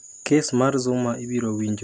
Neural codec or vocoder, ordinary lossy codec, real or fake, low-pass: none; none; real; none